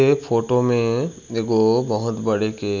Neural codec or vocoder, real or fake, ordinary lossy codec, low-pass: none; real; none; 7.2 kHz